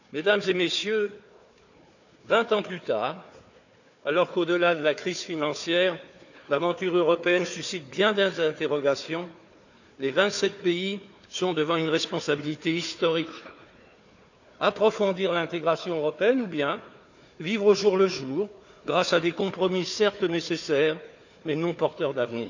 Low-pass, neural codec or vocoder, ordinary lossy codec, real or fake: 7.2 kHz; codec, 16 kHz, 4 kbps, FunCodec, trained on Chinese and English, 50 frames a second; none; fake